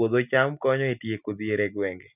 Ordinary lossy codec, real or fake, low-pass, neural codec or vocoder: none; real; 3.6 kHz; none